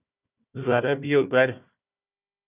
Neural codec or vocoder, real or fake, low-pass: codec, 16 kHz, 1 kbps, FunCodec, trained on Chinese and English, 50 frames a second; fake; 3.6 kHz